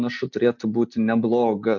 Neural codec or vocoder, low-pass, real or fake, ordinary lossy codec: none; 7.2 kHz; real; MP3, 48 kbps